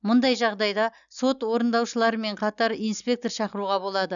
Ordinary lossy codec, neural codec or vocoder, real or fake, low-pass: none; none; real; 7.2 kHz